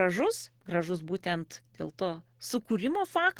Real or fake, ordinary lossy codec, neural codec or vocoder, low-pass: fake; Opus, 16 kbps; codec, 44.1 kHz, 7.8 kbps, DAC; 19.8 kHz